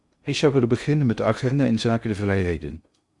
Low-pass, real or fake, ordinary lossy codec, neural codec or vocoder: 10.8 kHz; fake; Opus, 64 kbps; codec, 16 kHz in and 24 kHz out, 0.6 kbps, FocalCodec, streaming, 2048 codes